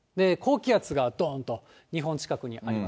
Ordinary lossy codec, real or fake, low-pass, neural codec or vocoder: none; real; none; none